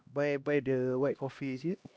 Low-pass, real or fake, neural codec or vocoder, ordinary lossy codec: none; fake; codec, 16 kHz, 1 kbps, X-Codec, HuBERT features, trained on LibriSpeech; none